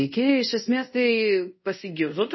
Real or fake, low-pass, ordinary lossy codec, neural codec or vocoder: fake; 7.2 kHz; MP3, 24 kbps; codec, 24 kHz, 0.5 kbps, DualCodec